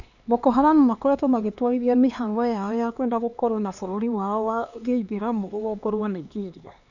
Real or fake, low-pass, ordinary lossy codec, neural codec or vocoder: fake; 7.2 kHz; none; codec, 16 kHz, 2 kbps, X-Codec, HuBERT features, trained on LibriSpeech